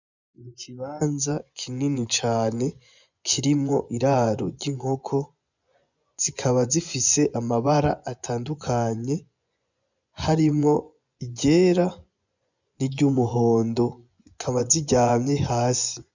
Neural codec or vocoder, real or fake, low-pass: vocoder, 24 kHz, 100 mel bands, Vocos; fake; 7.2 kHz